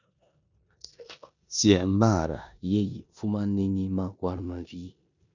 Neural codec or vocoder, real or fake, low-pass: codec, 16 kHz in and 24 kHz out, 0.9 kbps, LongCat-Audio-Codec, four codebook decoder; fake; 7.2 kHz